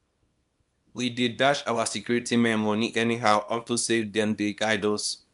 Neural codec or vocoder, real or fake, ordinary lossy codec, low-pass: codec, 24 kHz, 0.9 kbps, WavTokenizer, small release; fake; none; 10.8 kHz